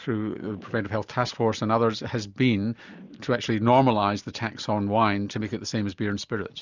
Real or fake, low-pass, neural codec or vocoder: real; 7.2 kHz; none